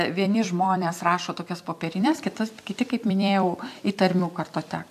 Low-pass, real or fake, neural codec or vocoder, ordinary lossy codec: 14.4 kHz; fake; vocoder, 44.1 kHz, 128 mel bands every 256 samples, BigVGAN v2; AAC, 96 kbps